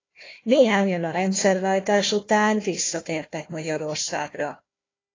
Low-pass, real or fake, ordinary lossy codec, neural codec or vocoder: 7.2 kHz; fake; AAC, 32 kbps; codec, 16 kHz, 1 kbps, FunCodec, trained on Chinese and English, 50 frames a second